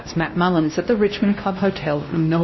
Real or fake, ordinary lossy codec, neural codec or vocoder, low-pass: fake; MP3, 24 kbps; codec, 16 kHz, 1 kbps, X-Codec, WavLM features, trained on Multilingual LibriSpeech; 7.2 kHz